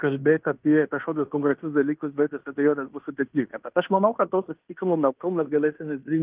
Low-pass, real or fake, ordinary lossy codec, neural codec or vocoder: 3.6 kHz; fake; Opus, 32 kbps; codec, 16 kHz in and 24 kHz out, 0.9 kbps, LongCat-Audio-Codec, fine tuned four codebook decoder